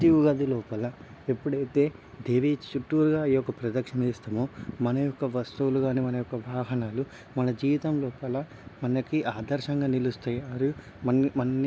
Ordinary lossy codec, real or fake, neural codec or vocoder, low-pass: none; real; none; none